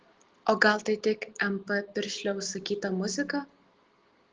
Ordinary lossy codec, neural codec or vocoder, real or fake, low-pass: Opus, 16 kbps; none; real; 7.2 kHz